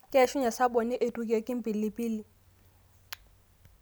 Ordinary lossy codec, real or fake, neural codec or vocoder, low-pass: none; real; none; none